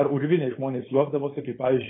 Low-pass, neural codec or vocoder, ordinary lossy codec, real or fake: 7.2 kHz; codec, 16 kHz, 4.8 kbps, FACodec; AAC, 16 kbps; fake